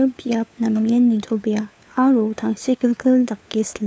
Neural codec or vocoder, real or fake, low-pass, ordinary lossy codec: codec, 16 kHz, 4 kbps, FunCodec, trained on LibriTTS, 50 frames a second; fake; none; none